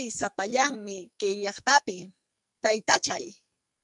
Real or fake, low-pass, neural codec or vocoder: fake; 10.8 kHz; codec, 44.1 kHz, 2.6 kbps, SNAC